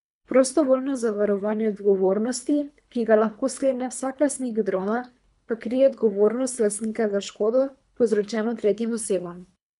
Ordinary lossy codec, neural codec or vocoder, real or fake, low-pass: MP3, 96 kbps; codec, 24 kHz, 3 kbps, HILCodec; fake; 10.8 kHz